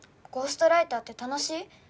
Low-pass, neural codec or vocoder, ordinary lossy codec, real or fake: none; none; none; real